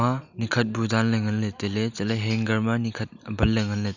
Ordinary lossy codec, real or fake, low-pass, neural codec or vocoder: none; real; 7.2 kHz; none